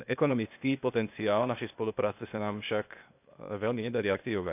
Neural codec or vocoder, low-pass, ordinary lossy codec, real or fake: codec, 16 kHz, 0.8 kbps, ZipCodec; 3.6 kHz; none; fake